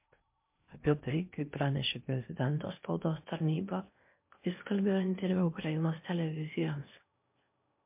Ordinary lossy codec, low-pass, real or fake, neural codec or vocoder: MP3, 32 kbps; 3.6 kHz; fake; codec, 16 kHz in and 24 kHz out, 0.6 kbps, FocalCodec, streaming, 2048 codes